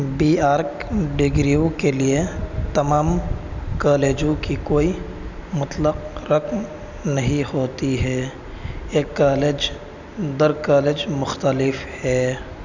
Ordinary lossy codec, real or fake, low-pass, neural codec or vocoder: none; real; 7.2 kHz; none